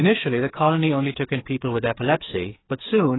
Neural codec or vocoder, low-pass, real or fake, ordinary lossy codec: codec, 16 kHz, 8 kbps, FreqCodec, smaller model; 7.2 kHz; fake; AAC, 16 kbps